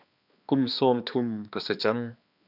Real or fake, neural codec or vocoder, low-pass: fake; codec, 16 kHz, 2 kbps, X-Codec, HuBERT features, trained on balanced general audio; 5.4 kHz